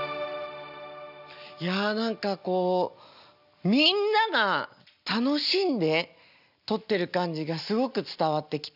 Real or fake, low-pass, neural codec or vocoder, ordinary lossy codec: real; 5.4 kHz; none; none